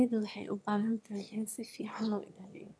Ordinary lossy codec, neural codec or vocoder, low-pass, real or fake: none; autoencoder, 22.05 kHz, a latent of 192 numbers a frame, VITS, trained on one speaker; none; fake